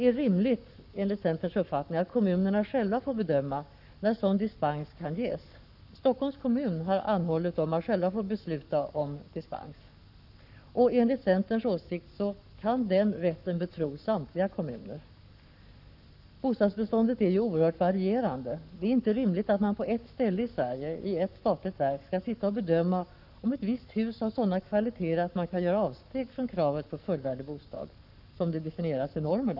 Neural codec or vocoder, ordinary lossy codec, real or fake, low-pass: codec, 44.1 kHz, 7.8 kbps, Pupu-Codec; none; fake; 5.4 kHz